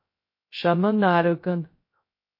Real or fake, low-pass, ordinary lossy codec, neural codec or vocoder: fake; 5.4 kHz; MP3, 32 kbps; codec, 16 kHz, 0.2 kbps, FocalCodec